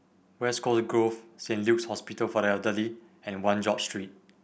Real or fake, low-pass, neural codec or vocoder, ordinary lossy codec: real; none; none; none